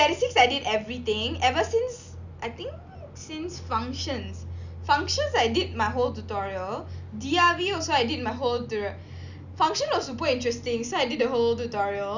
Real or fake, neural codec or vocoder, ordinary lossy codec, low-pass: real; none; none; 7.2 kHz